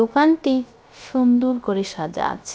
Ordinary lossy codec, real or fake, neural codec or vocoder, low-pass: none; fake; codec, 16 kHz, 0.3 kbps, FocalCodec; none